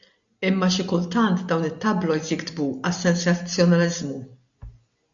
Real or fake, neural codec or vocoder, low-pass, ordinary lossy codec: real; none; 7.2 kHz; AAC, 64 kbps